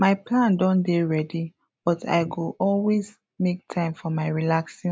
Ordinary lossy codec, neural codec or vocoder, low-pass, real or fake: none; none; none; real